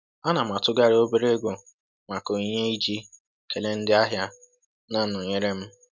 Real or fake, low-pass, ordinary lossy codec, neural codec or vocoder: real; none; none; none